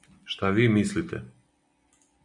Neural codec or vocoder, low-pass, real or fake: none; 10.8 kHz; real